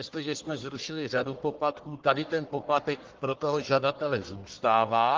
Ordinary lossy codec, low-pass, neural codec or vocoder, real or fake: Opus, 16 kbps; 7.2 kHz; codec, 44.1 kHz, 1.7 kbps, Pupu-Codec; fake